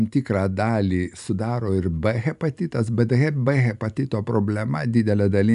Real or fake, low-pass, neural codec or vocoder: real; 10.8 kHz; none